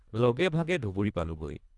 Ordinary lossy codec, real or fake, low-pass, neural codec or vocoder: none; fake; 10.8 kHz; codec, 24 kHz, 1.5 kbps, HILCodec